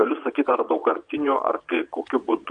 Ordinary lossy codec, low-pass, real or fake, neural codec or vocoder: MP3, 64 kbps; 9.9 kHz; fake; vocoder, 22.05 kHz, 80 mel bands, Vocos